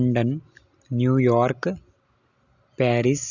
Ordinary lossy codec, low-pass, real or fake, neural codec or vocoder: none; 7.2 kHz; real; none